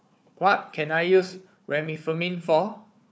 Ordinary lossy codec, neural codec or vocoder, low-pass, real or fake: none; codec, 16 kHz, 4 kbps, FunCodec, trained on Chinese and English, 50 frames a second; none; fake